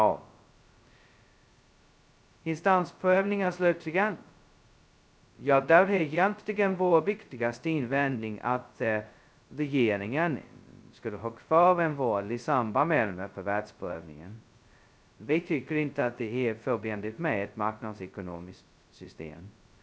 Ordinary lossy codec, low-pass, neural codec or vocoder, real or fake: none; none; codec, 16 kHz, 0.2 kbps, FocalCodec; fake